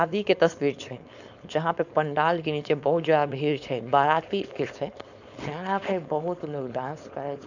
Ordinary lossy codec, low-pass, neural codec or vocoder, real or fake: none; 7.2 kHz; codec, 16 kHz, 4.8 kbps, FACodec; fake